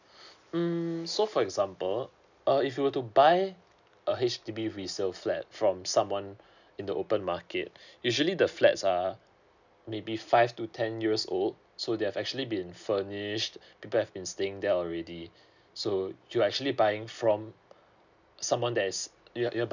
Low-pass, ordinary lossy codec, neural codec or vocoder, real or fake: 7.2 kHz; none; none; real